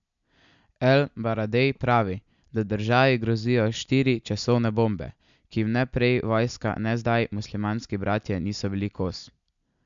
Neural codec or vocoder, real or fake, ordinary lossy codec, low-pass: none; real; MP3, 64 kbps; 7.2 kHz